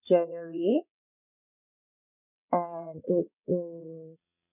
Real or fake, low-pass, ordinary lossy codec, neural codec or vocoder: fake; 3.6 kHz; none; vocoder, 22.05 kHz, 80 mel bands, WaveNeXt